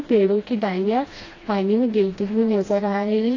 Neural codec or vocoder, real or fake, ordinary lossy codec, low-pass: codec, 16 kHz, 1 kbps, FreqCodec, smaller model; fake; AAC, 32 kbps; 7.2 kHz